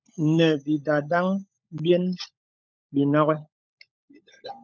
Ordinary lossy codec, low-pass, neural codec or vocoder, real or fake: MP3, 64 kbps; 7.2 kHz; codec, 16 kHz, 16 kbps, FunCodec, trained on LibriTTS, 50 frames a second; fake